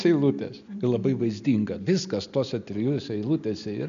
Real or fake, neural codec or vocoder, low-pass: real; none; 7.2 kHz